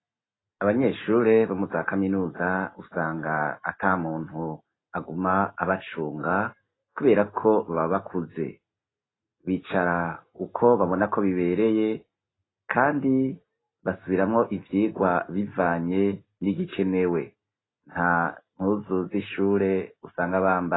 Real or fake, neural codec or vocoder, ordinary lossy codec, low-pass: real; none; AAC, 16 kbps; 7.2 kHz